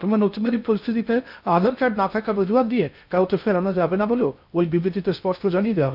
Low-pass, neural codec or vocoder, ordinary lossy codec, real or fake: 5.4 kHz; codec, 16 kHz in and 24 kHz out, 0.6 kbps, FocalCodec, streaming, 2048 codes; none; fake